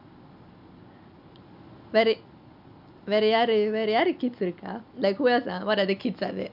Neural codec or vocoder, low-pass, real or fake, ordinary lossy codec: none; 5.4 kHz; real; none